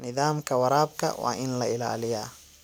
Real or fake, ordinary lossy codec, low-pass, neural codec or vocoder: real; none; none; none